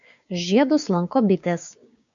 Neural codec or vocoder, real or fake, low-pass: codec, 16 kHz, 6 kbps, DAC; fake; 7.2 kHz